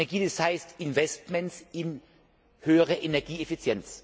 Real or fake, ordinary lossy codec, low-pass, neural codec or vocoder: real; none; none; none